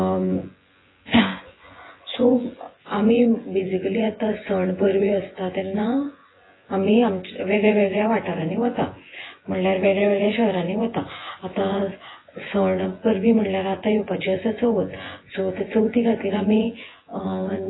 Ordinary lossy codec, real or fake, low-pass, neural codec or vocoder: AAC, 16 kbps; fake; 7.2 kHz; vocoder, 24 kHz, 100 mel bands, Vocos